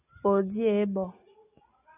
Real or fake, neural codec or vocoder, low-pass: real; none; 3.6 kHz